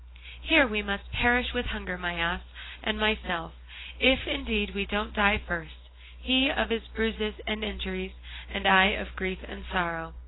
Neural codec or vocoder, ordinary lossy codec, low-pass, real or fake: none; AAC, 16 kbps; 7.2 kHz; real